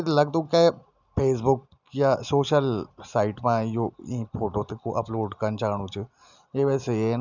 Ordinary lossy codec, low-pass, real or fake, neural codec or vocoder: none; 7.2 kHz; real; none